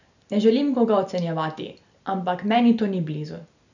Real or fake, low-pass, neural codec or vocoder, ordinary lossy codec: real; 7.2 kHz; none; none